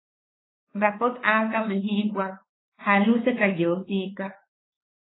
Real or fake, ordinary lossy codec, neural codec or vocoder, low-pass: fake; AAC, 16 kbps; codec, 16 kHz, 4 kbps, X-Codec, WavLM features, trained on Multilingual LibriSpeech; 7.2 kHz